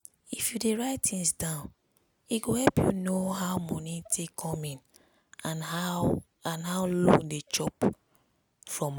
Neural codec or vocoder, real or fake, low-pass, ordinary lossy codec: none; real; none; none